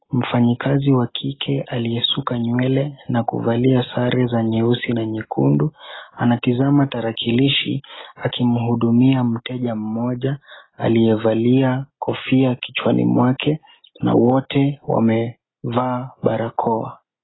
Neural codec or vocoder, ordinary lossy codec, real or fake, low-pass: none; AAC, 16 kbps; real; 7.2 kHz